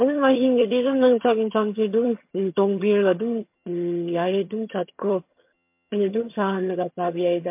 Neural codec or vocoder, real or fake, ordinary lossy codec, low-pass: vocoder, 22.05 kHz, 80 mel bands, HiFi-GAN; fake; MP3, 32 kbps; 3.6 kHz